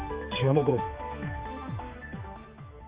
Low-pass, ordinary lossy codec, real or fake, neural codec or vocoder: 3.6 kHz; Opus, 32 kbps; fake; codec, 16 kHz, 4 kbps, X-Codec, HuBERT features, trained on general audio